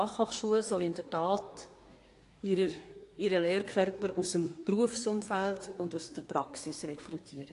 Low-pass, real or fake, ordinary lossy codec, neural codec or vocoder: 10.8 kHz; fake; AAC, 48 kbps; codec, 24 kHz, 1 kbps, SNAC